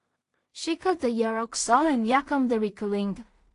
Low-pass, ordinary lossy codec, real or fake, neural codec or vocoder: 10.8 kHz; AAC, 48 kbps; fake; codec, 16 kHz in and 24 kHz out, 0.4 kbps, LongCat-Audio-Codec, two codebook decoder